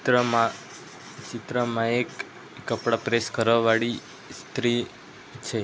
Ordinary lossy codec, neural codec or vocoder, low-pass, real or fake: none; none; none; real